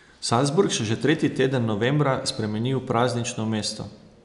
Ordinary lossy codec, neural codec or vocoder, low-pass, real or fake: none; none; 10.8 kHz; real